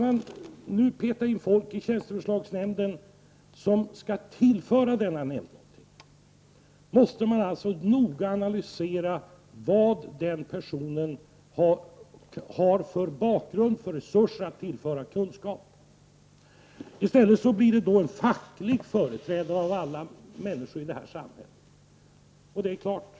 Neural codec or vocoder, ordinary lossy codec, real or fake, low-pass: none; none; real; none